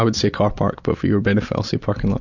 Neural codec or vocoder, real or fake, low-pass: none; real; 7.2 kHz